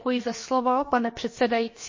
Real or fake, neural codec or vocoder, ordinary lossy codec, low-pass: fake; codec, 16 kHz, about 1 kbps, DyCAST, with the encoder's durations; MP3, 32 kbps; 7.2 kHz